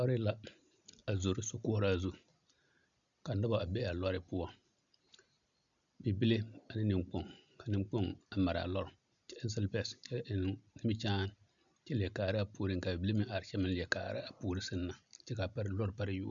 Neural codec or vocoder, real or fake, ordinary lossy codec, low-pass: none; real; MP3, 96 kbps; 7.2 kHz